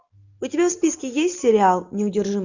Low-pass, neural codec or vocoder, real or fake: 7.2 kHz; none; real